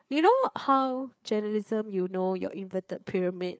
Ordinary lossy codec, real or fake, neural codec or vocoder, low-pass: none; fake; codec, 16 kHz, 4 kbps, FreqCodec, larger model; none